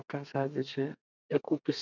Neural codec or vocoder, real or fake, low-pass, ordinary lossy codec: codec, 32 kHz, 1.9 kbps, SNAC; fake; 7.2 kHz; none